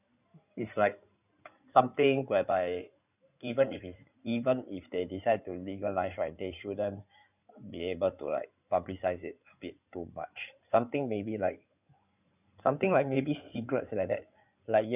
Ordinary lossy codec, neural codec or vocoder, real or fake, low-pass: none; codec, 16 kHz in and 24 kHz out, 2.2 kbps, FireRedTTS-2 codec; fake; 3.6 kHz